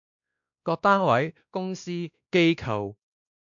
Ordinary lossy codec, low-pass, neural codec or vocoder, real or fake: AAC, 64 kbps; 7.2 kHz; codec, 16 kHz, 1 kbps, X-Codec, WavLM features, trained on Multilingual LibriSpeech; fake